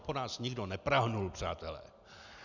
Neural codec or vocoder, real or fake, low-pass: none; real; 7.2 kHz